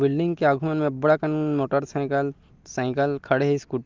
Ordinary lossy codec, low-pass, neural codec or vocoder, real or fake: Opus, 32 kbps; 7.2 kHz; none; real